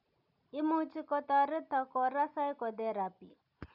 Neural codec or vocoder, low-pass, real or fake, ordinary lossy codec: none; 5.4 kHz; real; none